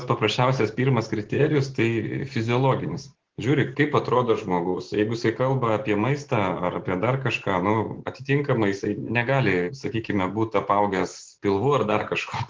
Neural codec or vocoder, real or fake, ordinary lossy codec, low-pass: none; real; Opus, 16 kbps; 7.2 kHz